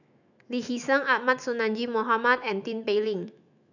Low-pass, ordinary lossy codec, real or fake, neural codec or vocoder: 7.2 kHz; none; fake; autoencoder, 48 kHz, 128 numbers a frame, DAC-VAE, trained on Japanese speech